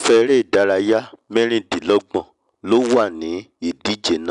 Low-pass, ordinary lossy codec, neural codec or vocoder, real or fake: 10.8 kHz; none; none; real